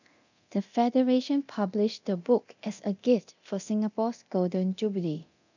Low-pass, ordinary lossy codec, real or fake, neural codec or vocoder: 7.2 kHz; none; fake; codec, 24 kHz, 0.9 kbps, DualCodec